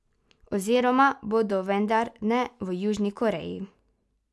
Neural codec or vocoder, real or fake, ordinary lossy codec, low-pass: none; real; none; none